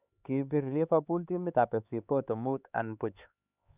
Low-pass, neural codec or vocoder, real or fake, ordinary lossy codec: 3.6 kHz; codec, 16 kHz, 4 kbps, X-Codec, HuBERT features, trained on LibriSpeech; fake; none